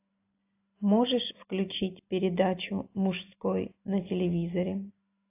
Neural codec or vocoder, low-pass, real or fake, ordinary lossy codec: none; 3.6 kHz; real; AAC, 24 kbps